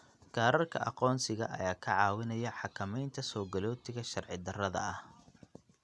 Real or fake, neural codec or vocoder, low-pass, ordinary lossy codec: real; none; none; none